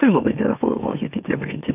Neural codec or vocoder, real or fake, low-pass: autoencoder, 44.1 kHz, a latent of 192 numbers a frame, MeloTTS; fake; 3.6 kHz